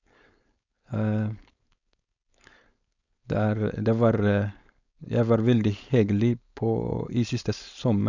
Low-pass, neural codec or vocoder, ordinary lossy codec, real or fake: 7.2 kHz; codec, 16 kHz, 4.8 kbps, FACodec; none; fake